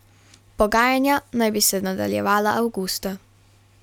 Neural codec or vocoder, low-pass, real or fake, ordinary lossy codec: none; 19.8 kHz; real; none